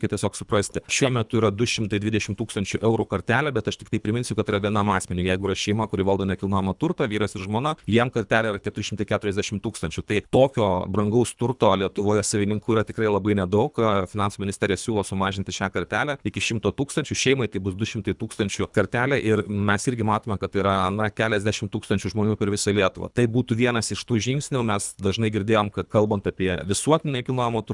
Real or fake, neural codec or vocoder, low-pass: fake; codec, 24 kHz, 3 kbps, HILCodec; 10.8 kHz